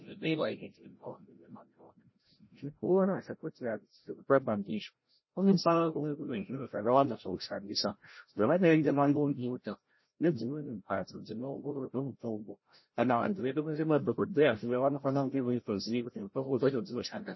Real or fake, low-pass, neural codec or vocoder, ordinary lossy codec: fake; 7.2 kHz; codec, 16 kHz, 0.5 kbps, FreqCodec, larger model; MP3, 24 kbps